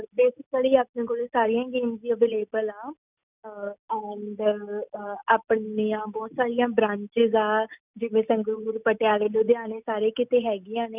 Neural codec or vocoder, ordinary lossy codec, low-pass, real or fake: none; none; 3.6 kHz; real